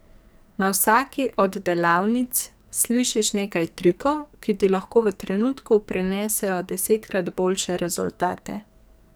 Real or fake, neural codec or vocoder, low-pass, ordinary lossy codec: fake; codec, 44.1 kHz, 2.6 kbps, SNAC; none; none